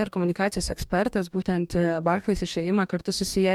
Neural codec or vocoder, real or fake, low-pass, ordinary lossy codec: codec, 44.1 kHz, 2.6 kbps, DAC; fake; 19.8 kHz; MP3, 96 kbps